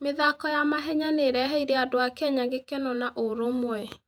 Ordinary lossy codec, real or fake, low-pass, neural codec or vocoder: none; fake; 19.8 kHz; vocoder, 48 kHz, 128 mel bands, Vocos